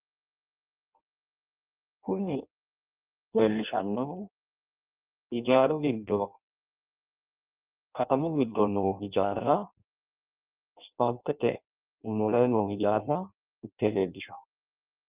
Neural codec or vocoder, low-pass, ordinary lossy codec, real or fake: codec, 16 kHz in and 24 kHz out, 0.6 kbps, FireRedTTS-2 codec; 3.6 kHz; Opus, 24 kbps; fake